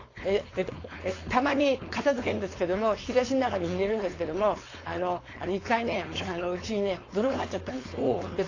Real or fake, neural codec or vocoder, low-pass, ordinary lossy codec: fake; codec, 16 kHz, 4.8 kbps, FACodec; 7.2 kHz; AAC, 32 kbps